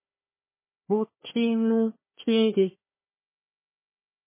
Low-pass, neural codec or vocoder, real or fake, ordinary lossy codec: 3.6 kHz; codec, 16 kHz, 1 kbps, FunCodec, trained on Chinese and English, 50 frames a second; fake; MP3, 16 kbps